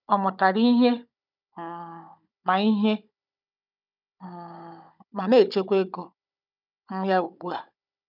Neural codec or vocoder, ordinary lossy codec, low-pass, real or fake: codec, 16 kHz, 16 kbps, FunCodec, trained on Chinese and English, 50 frames a second; none; 5.4 kHz; fake